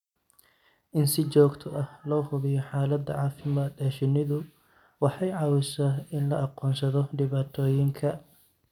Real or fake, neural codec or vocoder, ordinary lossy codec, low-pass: real; none; none; 19.8 kHz